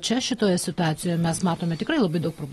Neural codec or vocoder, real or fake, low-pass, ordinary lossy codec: none; real; 19.8 kHz; AAC, 32 kbps